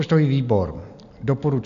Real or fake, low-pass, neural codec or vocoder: real; 7.2 kHz; none